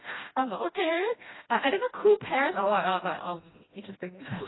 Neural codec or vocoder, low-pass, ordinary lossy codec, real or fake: codec, 16 kHz, 1 kbps, FreqCodec, smaller model; 7.2 kHz; AAC, 16 kbps; fake